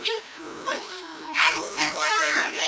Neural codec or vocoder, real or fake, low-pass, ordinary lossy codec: codec, 16 kHz, 1 kbps, FreqCodec, larger model; fake; none; none